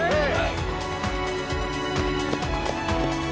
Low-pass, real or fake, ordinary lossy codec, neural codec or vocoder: none; real; none; none